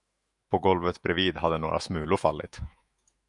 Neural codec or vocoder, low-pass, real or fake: autoencoder, 48 kHz, 128 numbers a frame, DAC-VAE, trained on Japanese speech; 10.8 kHz; fake